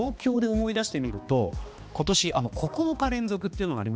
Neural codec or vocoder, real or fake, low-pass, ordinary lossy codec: codec, 16 kHz, 2 kbps, X-Codec, HuBERT features, trained on balanced general audio; fake; none; none